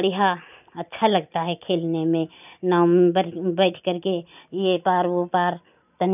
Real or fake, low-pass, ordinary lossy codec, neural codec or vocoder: real; 3.6 kHz; none; none